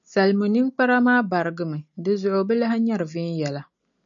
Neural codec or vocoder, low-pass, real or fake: none; 7.2 kHz; real